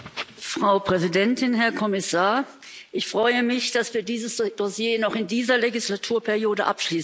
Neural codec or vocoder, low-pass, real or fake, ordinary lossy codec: none; none; real; none